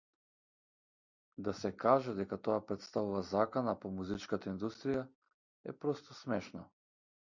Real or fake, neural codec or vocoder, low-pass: real; none; 7.2 kHz